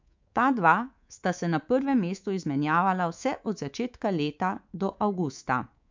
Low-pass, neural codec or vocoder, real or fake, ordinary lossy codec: 7.2 kHz; codec, 24 kHz, 3.1 kbps, DualCodec; fake; MP3, 64 kbps